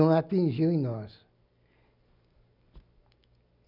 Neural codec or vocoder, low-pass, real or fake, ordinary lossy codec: none; 5.4 kHz; real; none